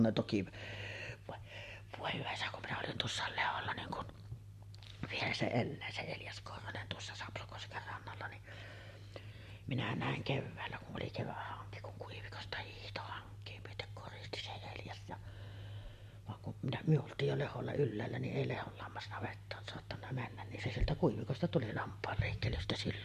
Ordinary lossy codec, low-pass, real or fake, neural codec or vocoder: MP3, 64 kbps; 14.4 kHz; real; none